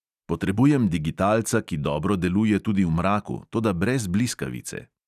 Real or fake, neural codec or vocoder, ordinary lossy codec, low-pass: real; none; none; 14.4 kHz